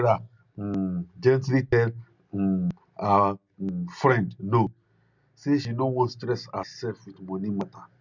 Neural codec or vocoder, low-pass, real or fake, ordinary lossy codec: none; 7.2 kHz; real; none